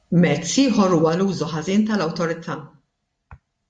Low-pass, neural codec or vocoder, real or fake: 9.9 kHz; none; real